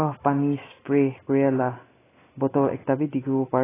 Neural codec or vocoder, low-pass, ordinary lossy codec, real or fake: none; 3.6 kHz; AAC, 16 kbps; real